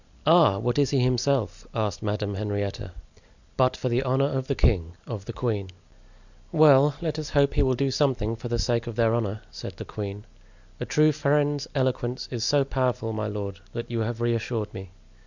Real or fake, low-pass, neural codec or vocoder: real; 7.2 kHz; none